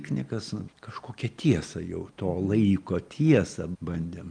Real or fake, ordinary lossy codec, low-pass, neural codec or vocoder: real; Opus, 32 kbps; 9.9 kHz; none